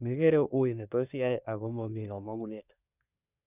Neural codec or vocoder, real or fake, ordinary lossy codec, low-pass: codec, 24 kHz, 1 kbps, SNAC; fake; none; 3.6 kHz